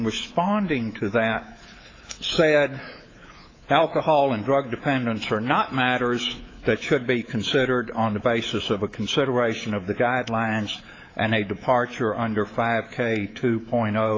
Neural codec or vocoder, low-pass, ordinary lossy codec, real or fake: codec, 24 kHz, 3.1 kbps, DualCodec; 7.2 kHz; AAC, 32 kbps; fake